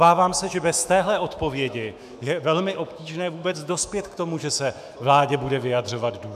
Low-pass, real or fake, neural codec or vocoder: 14.4 kHz; fake; autoencoder, 48 kHz, 128 numbers a frame, DAC-VAE, trained on Japanese speech